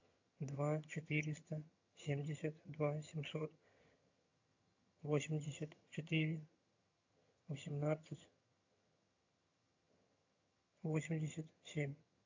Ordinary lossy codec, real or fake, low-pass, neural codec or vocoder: AAC, 48 kbps; fake; 7.2 kHz; vocoder, 22.05 kHz, 80 mel bands, HiFi-GAN